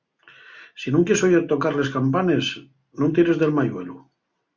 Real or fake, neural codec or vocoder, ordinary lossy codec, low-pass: real; none; Opus, 64 kbps; 7.2 kHz